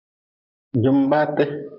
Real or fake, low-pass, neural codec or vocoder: fake; 5.4 kHz; vocoder, 24 kHz, 100 mel bands, Vocos